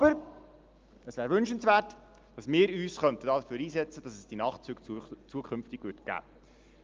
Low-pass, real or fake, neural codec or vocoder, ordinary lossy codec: 7.2 kHz; real; none; Opus, 24 kbps